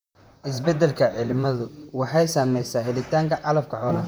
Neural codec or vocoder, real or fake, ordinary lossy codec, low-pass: vocoder, 44.1 kHz, 128 mel bands, Pupu-Vocoder; fake; none; none